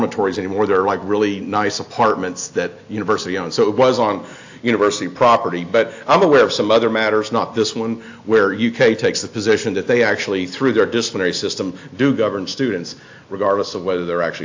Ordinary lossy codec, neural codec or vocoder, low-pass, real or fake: AAC, 48 kbps; none; 7.2 kHz; real